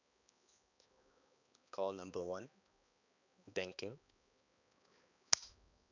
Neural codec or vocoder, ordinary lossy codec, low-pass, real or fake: codec, 16 kHz, 2 kbps, X-Codec, HuBERT features, trained on balanced general audio; none; 7.2 kHz; fake